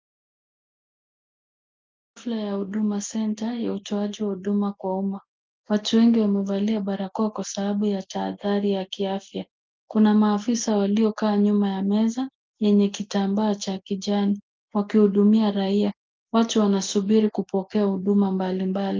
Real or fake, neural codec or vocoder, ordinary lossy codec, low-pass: real; none; Opus, 32 kbps; 7.2 kHz